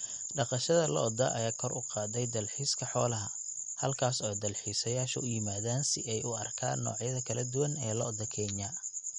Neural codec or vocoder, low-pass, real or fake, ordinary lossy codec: none; 10.8 kHz; real; MP3, 48 kbps